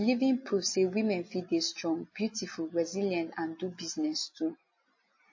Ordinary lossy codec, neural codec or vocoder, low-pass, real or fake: MP3, 32 kbps; none; 7.2 kHz; real